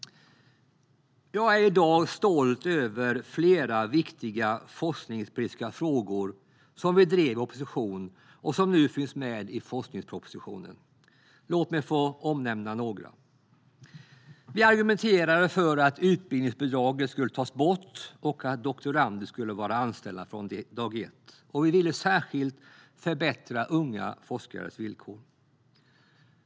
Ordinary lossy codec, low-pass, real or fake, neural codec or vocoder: none; none; real; none